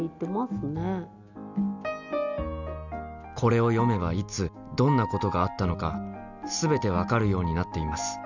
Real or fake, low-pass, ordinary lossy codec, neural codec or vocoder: real; 7.2 kHz; none; none